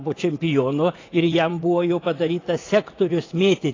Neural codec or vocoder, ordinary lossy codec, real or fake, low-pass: vocoder, 44.1 kHz, 80 mel bands, Vocos; AAC, 32 kbps; fake; 7.2 kHz